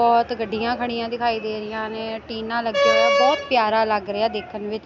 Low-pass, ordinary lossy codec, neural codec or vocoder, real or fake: 7.2 kHz; none; none; real